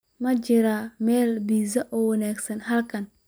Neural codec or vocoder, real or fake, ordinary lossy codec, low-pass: none; real; none; none